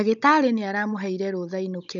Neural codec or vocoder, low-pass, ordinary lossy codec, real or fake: codec, 16 kHz, 16 kbps, FunCodec, trained on Chinese and English, 50 frames a second; 7.2 kHz; none; fake